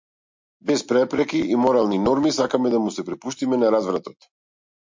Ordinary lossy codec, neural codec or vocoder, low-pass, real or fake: MP3, 48 kbps; none; 7.2 kHz; real